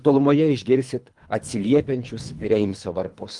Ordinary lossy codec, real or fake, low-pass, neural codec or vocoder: Opus, 32 kbps; fake; 10.8 kHz; codec, 24 kHz, 3 kbps, HILCodec